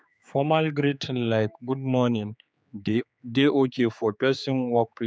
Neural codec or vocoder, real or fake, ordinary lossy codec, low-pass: codec, 16 kHz, 4 kbps, X-Codec, HuBERT features, trained on general audio; fake; none; none